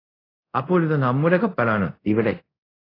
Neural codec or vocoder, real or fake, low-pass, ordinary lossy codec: codec, 24 kHz, 0.5 kbps, DualCodec; fake; 5.4 kHz; AAC, 24 kbps